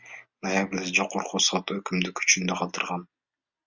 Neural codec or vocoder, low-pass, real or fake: none; 7.2 kHz; real